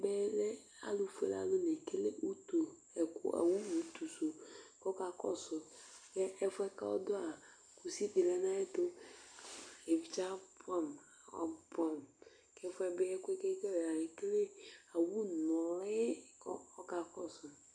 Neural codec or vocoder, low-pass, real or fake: none; 9.9 kHz; real